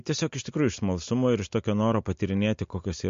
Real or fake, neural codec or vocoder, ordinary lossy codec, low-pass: real; none; MP3, 48 kbps; 7.2 kHz